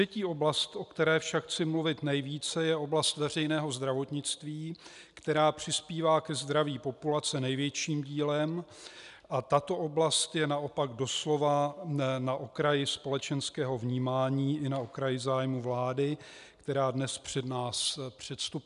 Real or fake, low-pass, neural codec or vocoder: real; 10.8 kHz; none